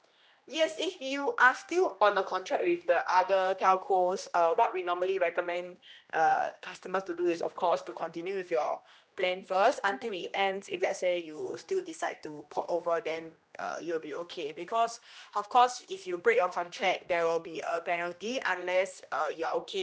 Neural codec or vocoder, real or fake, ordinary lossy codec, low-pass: codec, 16 kHz, 1 kbps, X-Codec, HuBERT features, trained on general audio; fake; none; none